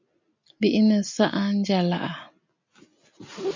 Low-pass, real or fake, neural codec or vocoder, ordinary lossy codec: 7.2 kHz; real; none; MP3, 64 kbps